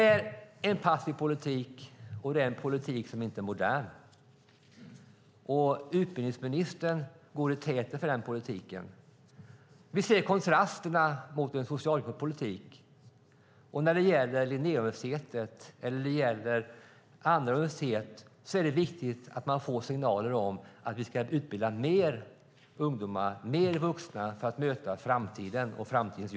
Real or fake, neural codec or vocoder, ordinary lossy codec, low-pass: real; none; none; none